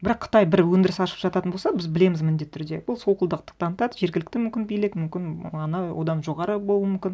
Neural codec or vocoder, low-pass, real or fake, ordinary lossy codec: none; none; real; none